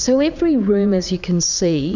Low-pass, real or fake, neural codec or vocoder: 7.2 kHz; fake; vocoder, 44.1 kHz, 80 mel bands, Vocos